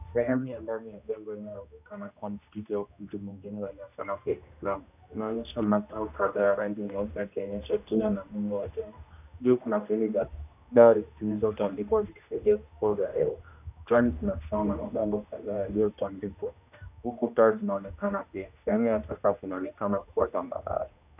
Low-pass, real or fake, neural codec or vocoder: 3.6 kHz; fake; codec, 16 kHz, 1 kbps, X-Codec, HuBERT features, trained on general audio